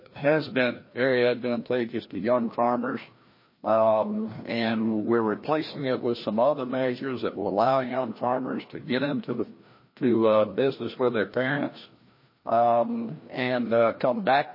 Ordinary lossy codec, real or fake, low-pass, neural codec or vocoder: MP3, 24 kbps; fake; 5.4 kHz; codec, 16 kHz, 1 kbps, FreqCodec, larger model